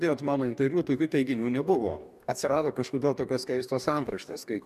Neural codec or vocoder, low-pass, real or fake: codec, 44.1 kHz, 2.6 kbps, DAC; 14.4 kHz; fake